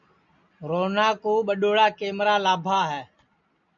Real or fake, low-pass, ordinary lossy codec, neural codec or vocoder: real; 7.2 kHz; MP3, 96 kbps; none